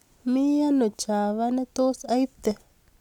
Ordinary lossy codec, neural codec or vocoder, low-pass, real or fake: none; none; 19.8 kHz; real